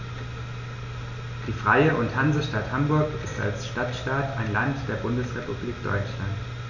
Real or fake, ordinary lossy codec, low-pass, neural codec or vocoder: real; none; 7.2 kHz; none